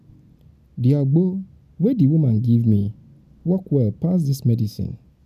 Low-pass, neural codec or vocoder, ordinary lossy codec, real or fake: 14.4 kHz; none; MP3, 96 kbps; real